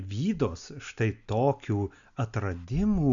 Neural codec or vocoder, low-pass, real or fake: none; 7.2 kHz; real